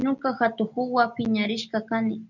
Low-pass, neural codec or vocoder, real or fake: 7.2 kHz; none; real